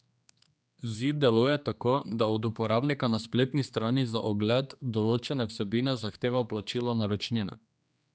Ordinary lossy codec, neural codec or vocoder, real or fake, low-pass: none; codec, 16 kHz, 2 kbps, X-Codec, HuBERT features, trained on general audio; fake; none